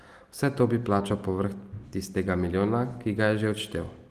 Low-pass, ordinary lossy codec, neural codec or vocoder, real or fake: 14.4 kHz; Opus, 24 kbps; none; real